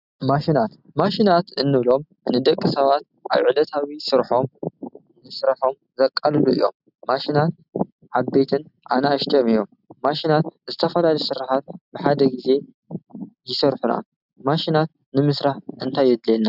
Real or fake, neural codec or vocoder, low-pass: real; none; 5.4 kHz